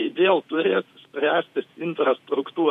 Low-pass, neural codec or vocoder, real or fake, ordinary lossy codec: 14.4 kHz; vocoder, 48 kHz, 128 mel bands, Vocos; fake; MP3, 64 kbps